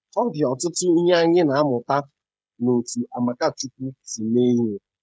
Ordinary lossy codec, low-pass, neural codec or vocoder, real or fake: none; none; codec, 16 kHz, 16 kbps, FreqCodec, smaller model; fake